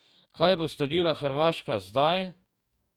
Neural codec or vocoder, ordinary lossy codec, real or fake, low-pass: codec, 44.1 kHz, 2.6 kbps, DAC; none; fake; 19.8 kHz